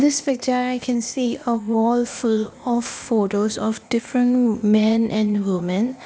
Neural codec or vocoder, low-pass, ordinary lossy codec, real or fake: codec, 16 kHz, 0.8 kbps, ZipCodec; none; none; fake